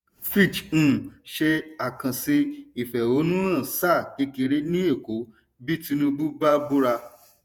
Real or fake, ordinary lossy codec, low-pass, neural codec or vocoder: fake; none; none; vocoder, 48 kHz, 128 mel bands, Vocos